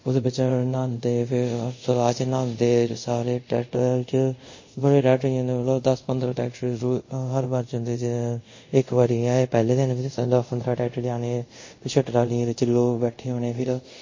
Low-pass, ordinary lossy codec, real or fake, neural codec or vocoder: 7.2 kHz; MP3, 32 kbps; fake; codec, 24 kHz, 0.5 kbps, DualCodec